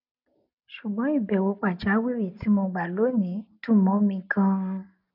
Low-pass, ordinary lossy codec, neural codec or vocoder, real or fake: 5.4 kHz; none; none; real